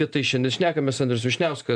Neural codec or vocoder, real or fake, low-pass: none; real; 9.9 kHz